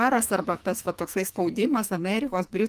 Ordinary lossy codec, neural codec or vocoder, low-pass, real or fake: Opus, 32 kbps; codec, 44.1 kHz, 2.6 kbps, SNAC; 14.4 kHz; fake